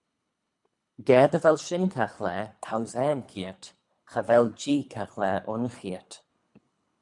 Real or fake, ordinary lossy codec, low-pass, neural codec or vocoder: fake; MP3, 96 kbps; 10.8 kHz; codec, 24 kHz, 3 kbps, HILCodec